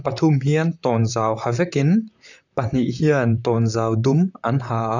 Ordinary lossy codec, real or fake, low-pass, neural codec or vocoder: none; fake; 7.2 kHz; vocoder, 44.1 kHz, 128 mel bands, Pupu-Vocoder